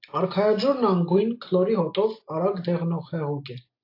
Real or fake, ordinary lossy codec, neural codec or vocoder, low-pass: real; AAC, 32 kbps; none; 5.4 kHz